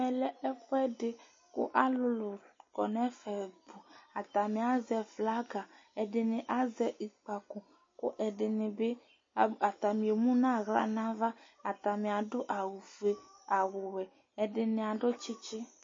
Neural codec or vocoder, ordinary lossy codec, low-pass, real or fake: none; MP3, 32 kbps; 7.2 kHz; real